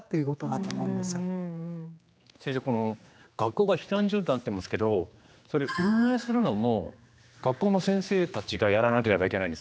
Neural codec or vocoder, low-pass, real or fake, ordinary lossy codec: codec, 16 kHz, 2 kbps, X-Codec, HuBERT features, trained on general audio; none; fake; none